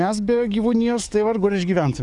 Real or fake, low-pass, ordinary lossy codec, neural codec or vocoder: fake; 10.8 kHz; Opus, 64 kbps; codec, 44.1 kHz, 7.8 kbps, DAC